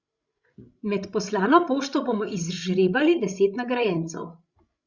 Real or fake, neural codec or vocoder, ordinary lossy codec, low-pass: fake; codec, 16 kHz, 16 kbps, FreqCodec, larger model; Opus, 64 kbps; 7.2 kHz